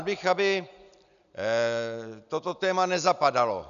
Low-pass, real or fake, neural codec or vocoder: 7.2 kHz; real; none